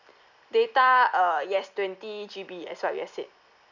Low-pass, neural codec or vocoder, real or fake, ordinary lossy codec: 7.2 kHz; none; real; none